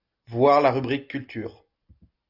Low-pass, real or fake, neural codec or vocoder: 5.4 kHz; real; none